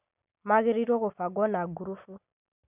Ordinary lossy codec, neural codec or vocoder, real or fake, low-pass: none; none; real; 3.6 kHz